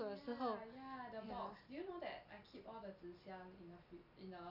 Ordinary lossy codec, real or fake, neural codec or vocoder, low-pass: none; real; none; 5.4 kHz